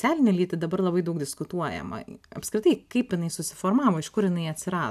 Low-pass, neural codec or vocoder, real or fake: 14.4 kHz; none; real